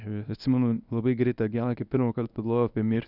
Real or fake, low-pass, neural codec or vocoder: fake; 5.4 kHz; codec, 24 kHz, 0.9 kbps, WavTokenizer, medium speech release version 1